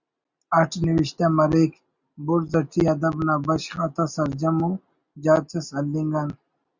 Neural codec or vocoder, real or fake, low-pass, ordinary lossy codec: none; real; 7.2 kHz; Opus, 64 kbps